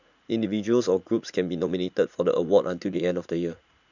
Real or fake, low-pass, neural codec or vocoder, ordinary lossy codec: fake; 7.2 kHz; vocoder, 44.1 kHz, 80 mel bands, Vocos; none